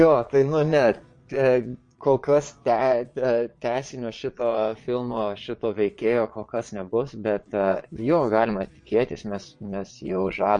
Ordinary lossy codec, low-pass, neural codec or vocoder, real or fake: MP3, 48 kbps; 9.9 kHz; vocoder, 22.05 kHz, 80 mel bands, Vocos; fake